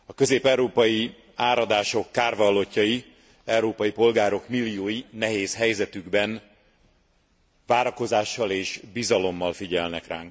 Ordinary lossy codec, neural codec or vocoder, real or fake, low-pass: none; none; real; none